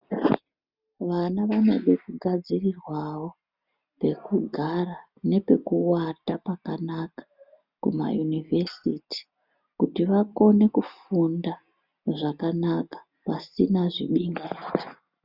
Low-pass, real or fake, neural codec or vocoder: 5.4 kHz; real; none